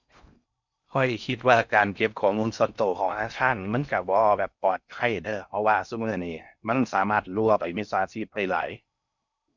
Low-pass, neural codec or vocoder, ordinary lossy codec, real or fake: 7.2 kHz; codec, 16 kHz in and 24 kHz out, 0.6 kbps, FocalCodec, streaming, 4096 codes; none; fake